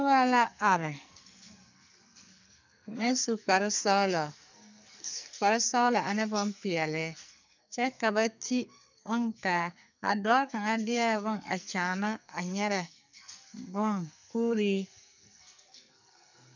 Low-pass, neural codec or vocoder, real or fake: 7.2 kHz; codec, 44.1 kHz, 2.6 kbps, SNAC; fake